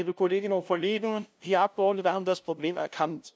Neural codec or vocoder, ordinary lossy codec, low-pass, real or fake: codec, 16 kHz, 0.5 kbps, FunCodec, trained on LibriTTS, 25 frames a second; none; none; fake